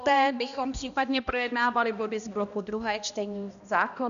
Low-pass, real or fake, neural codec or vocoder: 7.2 kHz; fake; codec, 16 kHz, 1 kbps, X-Codec, HuBERT features, trained on balanced general audio